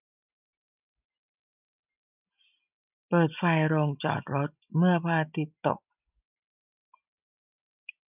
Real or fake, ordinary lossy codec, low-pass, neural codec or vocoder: real; none; 3.6 kHz; none